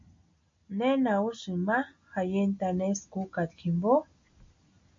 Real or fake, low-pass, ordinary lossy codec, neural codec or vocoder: real; 7.2 kHz; MP3, 48 kbps; none